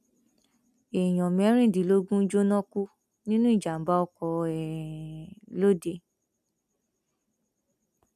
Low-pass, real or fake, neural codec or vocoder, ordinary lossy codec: 14.4 kHz; real; none; none